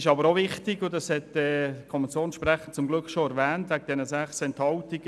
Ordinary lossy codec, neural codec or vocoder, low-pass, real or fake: none; none; none; real